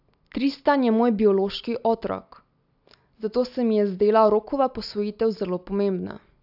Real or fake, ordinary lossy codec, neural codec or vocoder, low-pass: real; AAC, 48 kbps; none; 5.4 kHz